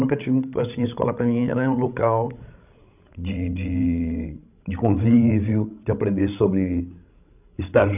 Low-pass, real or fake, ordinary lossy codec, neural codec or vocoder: 3.6 kHz; fake; none; codec, 16 kHz, 16 kbps, FreqCodec, larger model